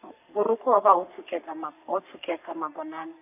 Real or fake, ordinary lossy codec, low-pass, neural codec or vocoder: fake; none; 3.6 kHz; codec, 44.1 kHz, 3.4 kbps, Pupu-Codec